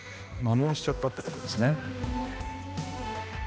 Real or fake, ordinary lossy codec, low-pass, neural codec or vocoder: fake; none; none; codec, 16 kHz, 1 kbps, X-Codec, HuBERT features, trained on balanced general audio